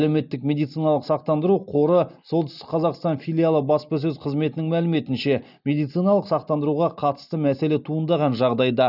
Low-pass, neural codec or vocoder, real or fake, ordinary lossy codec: 5.4 kHz; none; real; none